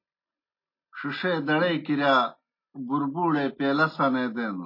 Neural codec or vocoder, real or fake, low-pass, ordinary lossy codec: none; real; 5.4 kHz; MP3, 24 kbps